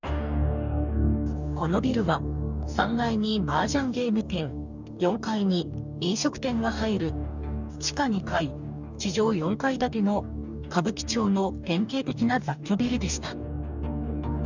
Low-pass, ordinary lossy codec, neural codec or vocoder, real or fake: 7.2 kHz; none; codec, 44.1 kHz, 2.6 kbps, DAC; fake